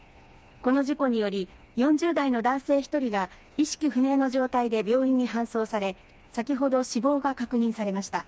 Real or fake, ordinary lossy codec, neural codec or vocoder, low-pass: fake; none; codec, 16 kHz, 2 kbps, FreqCodec, smaller model; none